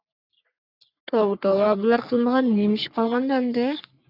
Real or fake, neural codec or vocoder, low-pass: fake; codec, 44.1 kHz, 3.4 kbps, Pupu-Codec; 5.4 kHz